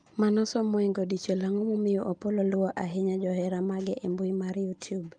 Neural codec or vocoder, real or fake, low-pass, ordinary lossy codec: none; real; 9.9 kHz; Opus, 32 kbps